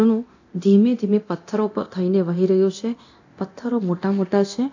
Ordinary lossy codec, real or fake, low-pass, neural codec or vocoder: AAC, 48 kbps; fake; 7.2 kHz; codec, 24 kHz, 0.9 kbps, DualCodec